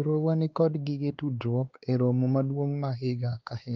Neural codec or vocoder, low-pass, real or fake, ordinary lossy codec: codec, 16 kHz, 2 kbps, X-Codec, WavLM features, trained on Multilingual LibriSpeech; 7.2 kHz; fake; Opus, 32 kbps